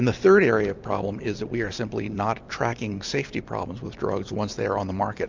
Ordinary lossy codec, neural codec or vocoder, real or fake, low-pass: MP3, 64 kbps; none; real; 7.2 kHz